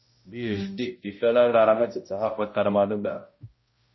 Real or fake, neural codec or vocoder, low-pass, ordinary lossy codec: fake; codec, 16 kHz, 0.5 kbps, X-Codec, HuBERT features, trained on balanced general audio; 7.2 kHz; MP3, 24 kbps